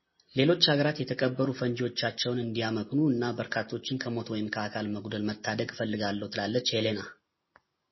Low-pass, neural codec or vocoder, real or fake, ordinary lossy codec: 7.2 kHz; none; real; MP3, 24 kbps